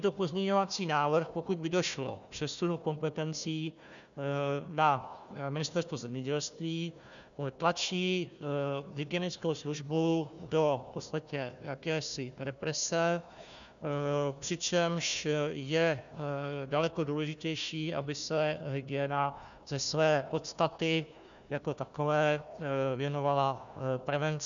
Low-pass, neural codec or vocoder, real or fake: 7.2 kHz; codec, 16 kHz, 1 kbps, FunCodec, trained on Chinese and English, 50 frames a second; fake